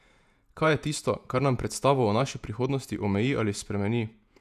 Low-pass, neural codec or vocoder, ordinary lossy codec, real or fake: 14.4 kHz; vocoder, 48 kHz, 128 mel bands, Vocos; none; fake